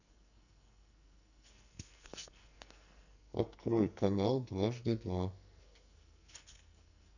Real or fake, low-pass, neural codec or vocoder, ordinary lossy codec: fake; 7.2 kHz; codec, 44.1 kHz, 2.6 kbps, SNAC; none